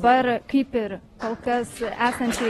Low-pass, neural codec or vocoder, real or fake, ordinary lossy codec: 19.8 kHz; none; real; AAC, 32 kbps